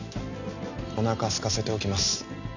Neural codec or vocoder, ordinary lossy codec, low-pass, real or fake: none; none; 7.2 kHz; real